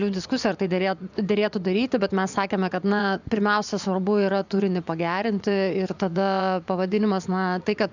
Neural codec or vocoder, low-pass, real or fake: vocoder, 24 kHz, 100 mel bands, Vocos; 7.2 kHz; fake